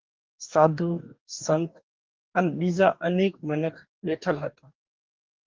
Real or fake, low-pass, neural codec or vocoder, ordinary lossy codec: fake; 7.2 kHz; codec, 44.1 kHz, 2.6 kbps, DAC; Opus, 32 kbps